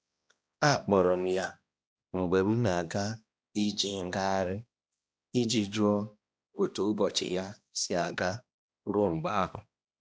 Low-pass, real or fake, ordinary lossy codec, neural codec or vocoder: none; fake; none; codec, 16 kHz, 1 kbps, X-Codec, HuBERT features, trained on balanced general audio